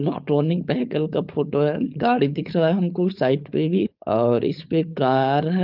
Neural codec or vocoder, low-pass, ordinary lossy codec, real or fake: codec, 16 kHz, 4.8 kbps, FACodec; 5.4 kHz; Opus, 24 kbps; fake